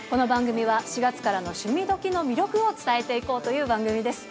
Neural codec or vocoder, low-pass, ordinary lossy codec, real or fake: none; none; none; real